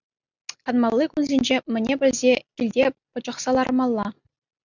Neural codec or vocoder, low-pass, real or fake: none; 7.2 kHz; real